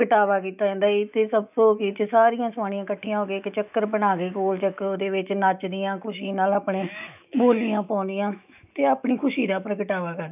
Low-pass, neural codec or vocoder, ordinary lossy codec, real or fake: 3.6 kHz; autoencoder, 48 kHz, 128 numbers a frame, DAC-VAE, trained on Japanese speech; none; fake